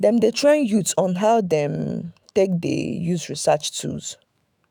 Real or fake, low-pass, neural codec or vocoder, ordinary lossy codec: fake; none; autoencoder, 48 kHz, 128 numbers a frame, DAC-VAE, trained on Japanese speech; none